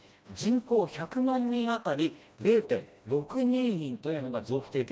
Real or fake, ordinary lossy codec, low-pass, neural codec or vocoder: fake; none; none; codec, 16 kHz, 1 kbps, FreqCodec, smaller model